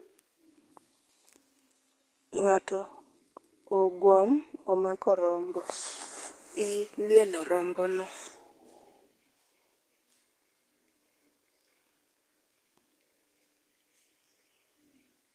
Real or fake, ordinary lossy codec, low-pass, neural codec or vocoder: fake; Opus, 24 kbps; 14.4 kHz; codec, 32 kHz, 1.9 kbps, SNAC